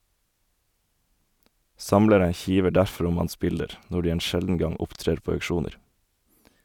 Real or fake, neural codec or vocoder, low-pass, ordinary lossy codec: real; none; 19.8 kHz; none